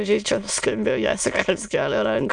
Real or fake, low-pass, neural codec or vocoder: fake; 9.9 kHz; autoencoder, 22.05 kHz, a latent of 192 numbers a frame, VITS, trained on many speakers